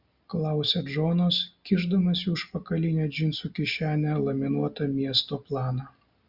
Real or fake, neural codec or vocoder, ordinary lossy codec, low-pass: real; none; Opus, 64 kbps; 5.4 kHz